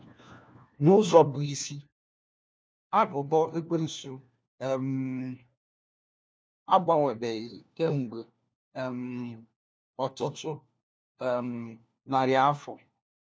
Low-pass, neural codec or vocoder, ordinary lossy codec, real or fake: none; codec, 16 kHz, 1 kbps, FunCodec, trained on LibriTTS, 50 frames a second; none; fake